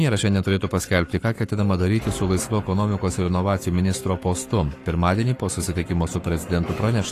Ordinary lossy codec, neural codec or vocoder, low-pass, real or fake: AAC, 48 kbps; codec, 44.1 kHz, 7.8 kbps, Pupu-Codec; 14.4 kHz; fake